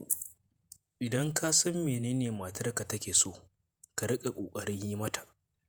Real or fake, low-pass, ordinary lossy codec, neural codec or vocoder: fake; none; none; vocoder, 48 kHz, 128 mel bands, Vocos